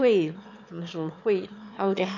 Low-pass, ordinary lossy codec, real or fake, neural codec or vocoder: 7.2 kHz; none; fake; autoencoder, 22.05 kHz, a latent of 192 numbers a frame, VITS, trained on one speaker